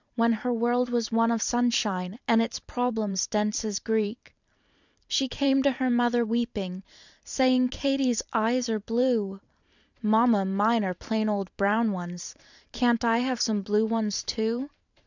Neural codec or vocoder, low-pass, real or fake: vocoder, 44.1 kHz, 128 mel bands every 512 samples, BigVGAN v2; 7.2 kHz; fake